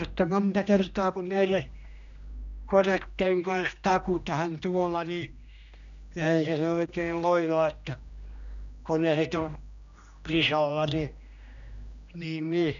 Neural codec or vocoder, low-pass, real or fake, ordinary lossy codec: codec, 16 kHz, 1 kbps, X-Codec, HuBERT features, trained on general audio; 7.2 kHz; fake; none